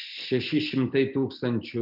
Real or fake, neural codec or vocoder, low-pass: real; none; 5.4 kHz